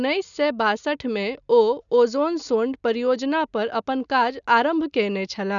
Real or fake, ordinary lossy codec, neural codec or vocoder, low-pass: real; none; none; 7.2 kHz